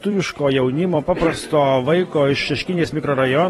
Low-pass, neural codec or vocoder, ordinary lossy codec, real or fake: 19.8 kHz; vocoder, 44.1 kHz, 128 mel bands every 256 samples, BigVGAN v2; AAC, 32 kbps; fake